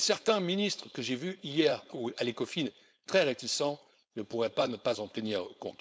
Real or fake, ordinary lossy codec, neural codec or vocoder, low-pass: fake; none; codec, 16 kHz, 4.8 kbps, FACodec; none